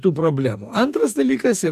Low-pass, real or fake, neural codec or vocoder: 14.4 kHz; fake; codec, 44.1 kHz, 2.6 kbps, DAC